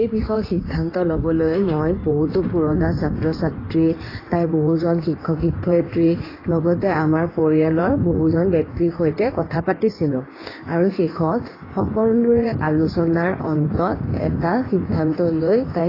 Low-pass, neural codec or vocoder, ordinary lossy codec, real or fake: 5.4 kHz; codec, 16 kHz in and 24 kHz out, 1.1 kbps, FireRedTTS-2 codec; AAC, 24 kbps; fake